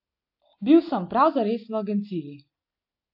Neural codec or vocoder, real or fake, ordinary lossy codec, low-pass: none; real; AAC, 48 kbps; 5.4 kHz